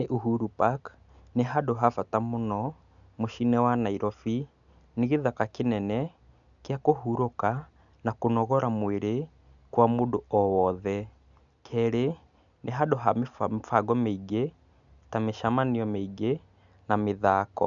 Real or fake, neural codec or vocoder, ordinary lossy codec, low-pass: real; none; none; 7.2 kHz